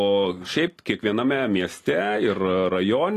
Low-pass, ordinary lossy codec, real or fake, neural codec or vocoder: 14.4 kHz; AAC, 48 kbps; real; none